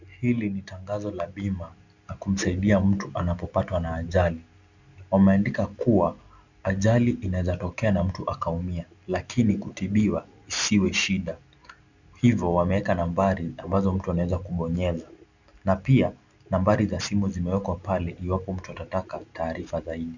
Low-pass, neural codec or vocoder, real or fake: 7.2 kHz; none; real